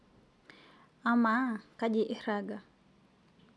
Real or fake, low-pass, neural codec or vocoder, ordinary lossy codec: real; none; none; none